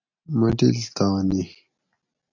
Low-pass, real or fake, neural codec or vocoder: 7.2 kHz; real; none